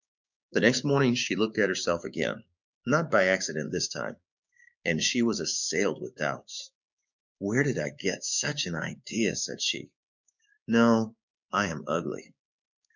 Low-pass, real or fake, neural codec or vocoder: 7.2 kHz; fake; codec, 16 kHz, 6 kbps, DAC